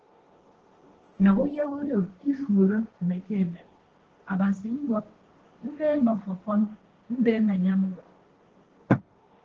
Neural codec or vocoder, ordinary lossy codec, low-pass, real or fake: codec, 16 kHz, 1.1 kbps, Voila-Tokenizer; Opus, 16 kbps; 7.2 kHz; fake